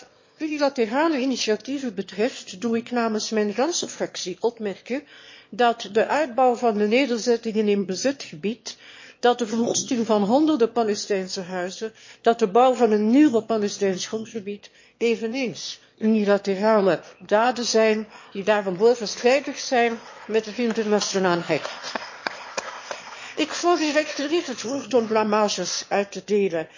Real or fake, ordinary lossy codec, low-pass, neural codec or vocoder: fake; MP3, 32 kbps; 7.2 kHz; autoencoder, 22.05 kHz, a latent of 192 numbers a frame, VITS, trained on one speaker